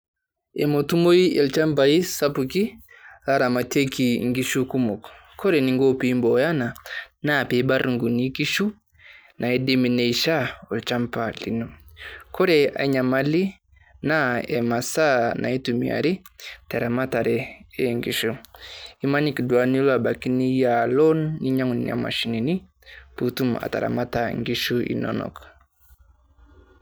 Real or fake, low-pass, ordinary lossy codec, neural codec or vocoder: real; none; none; none